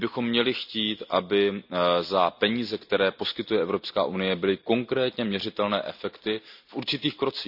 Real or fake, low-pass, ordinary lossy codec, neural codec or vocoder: real; 5.4 kHz; none; none